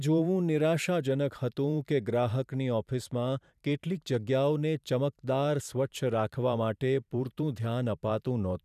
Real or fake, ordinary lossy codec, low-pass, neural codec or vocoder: fake; none; 14.4 kHz; vocoder, 44.1 kHz, 128 mel bands every 512 samples, BigVGAN v2